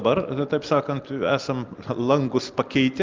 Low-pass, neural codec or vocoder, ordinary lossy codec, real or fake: 7.2 kHz; none; Opus, 32 kbps; real